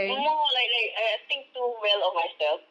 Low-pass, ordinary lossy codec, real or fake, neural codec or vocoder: none; none; real; none